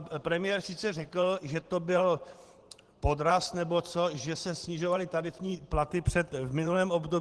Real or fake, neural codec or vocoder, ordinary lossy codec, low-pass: fake; vocoder, 44.1 kHz, 128 mel bands every 512 samples, BigVGAN v2; Opus, 16 kbps; 10.8 kHz